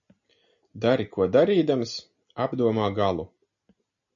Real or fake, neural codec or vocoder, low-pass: real; none; 7.2 kHz